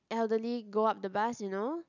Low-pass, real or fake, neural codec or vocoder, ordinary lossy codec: 7.2 kHz; real; none; none